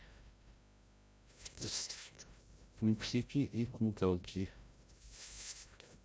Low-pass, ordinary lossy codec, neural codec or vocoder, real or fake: none; none; codec, 16 kHz, 0.5 kbps, FreqCodec, larger model; fake